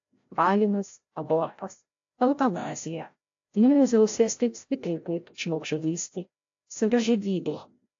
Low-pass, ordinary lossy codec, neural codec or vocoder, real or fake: 7.2 kHz; AAC, 48 kbps; codec, 16 kHz, 0.5 kbps, FreqCodec, larger model; fake